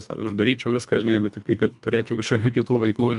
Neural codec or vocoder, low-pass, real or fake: codec, 24 kHz, 1.5 kbps, HILCodec; 10.8 kHz; fake